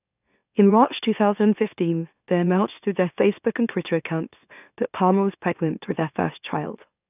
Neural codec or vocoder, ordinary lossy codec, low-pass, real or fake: autoencoder, 44.1 kHz, a latent of 192 numbers a frame, MeloTTS; none; 3.6 kHz; fake